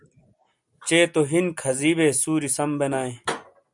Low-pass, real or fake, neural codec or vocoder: 10.8 kHz; real; none